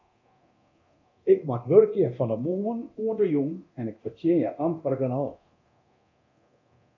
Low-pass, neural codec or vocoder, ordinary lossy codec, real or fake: 7.2 kHz; codec, 24 kHz, 0.9 kbps, DualCodec; MP3, 48 kbps; fake